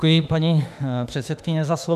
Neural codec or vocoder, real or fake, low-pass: autoencoder, 48 kHz, 32 numbers a frame, DAC-VAE, trained on Japanese speech; fake; 14.4 kHz